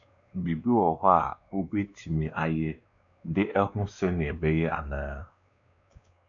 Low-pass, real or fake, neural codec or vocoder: 7.2 kHz; fake; codec, 16 kHz, 2 kbps, X-Codec, WavLM features, trained on Multilingual LibriSpeech